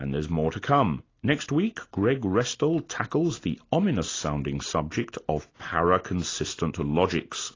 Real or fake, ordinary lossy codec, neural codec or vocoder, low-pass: real; AAC, 32 kbps; none; 7.2 kHz